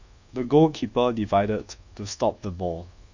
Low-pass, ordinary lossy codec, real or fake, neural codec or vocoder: 7.2 kHz; none; fake; codec, 24 kHz, 1.2 kbps, DualCodec